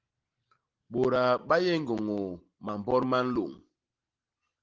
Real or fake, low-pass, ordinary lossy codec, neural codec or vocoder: real; 7.2 kHz; Opus, 16 kbps; none